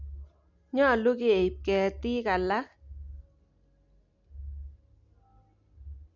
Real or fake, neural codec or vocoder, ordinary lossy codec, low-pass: real; none; none; 7.2 kHz